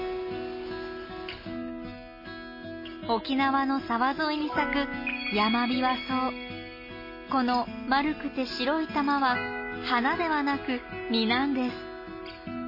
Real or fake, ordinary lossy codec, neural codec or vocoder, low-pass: real; MP3, 24 kbps; none; 5.4 kHz